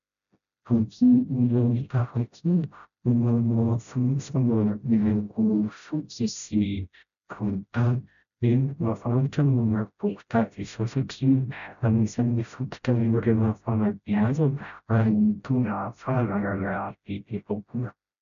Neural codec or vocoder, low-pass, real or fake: codec, 16 kHz, 0.5 kbps, FreqCodec, smaller model; 7.2 kHz; fake